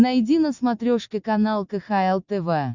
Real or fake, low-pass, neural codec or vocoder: real; 7.2 kHz; none